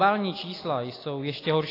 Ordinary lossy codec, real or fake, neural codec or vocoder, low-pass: AAC, 24 kbps; real; none; 5.4 kHz